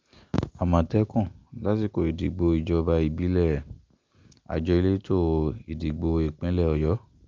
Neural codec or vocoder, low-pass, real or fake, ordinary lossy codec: none; 7.2 kHz; real; Opus, 24 kbps